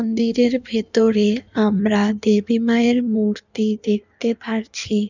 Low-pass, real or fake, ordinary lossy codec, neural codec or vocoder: 7.2 kHz; fake; none; codec, 16 kHz in and 24 kHz out, 1.1 kbps, FireRedTTS-2 codec